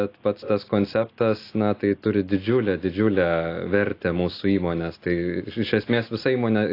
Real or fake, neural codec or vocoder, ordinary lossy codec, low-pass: real; none; AAC, 32 kbps; 5.4 kHz